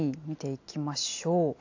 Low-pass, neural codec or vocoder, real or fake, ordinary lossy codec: 7.2 kHz; none; real; AAC, 48 kbps